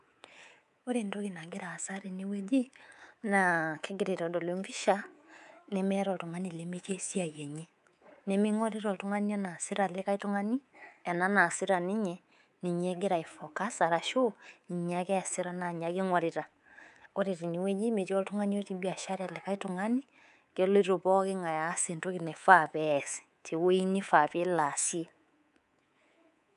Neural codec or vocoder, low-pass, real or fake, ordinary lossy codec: codec, 24 kHz, 3.1 kbps, DualCodec; 10.8 kHz; fake; none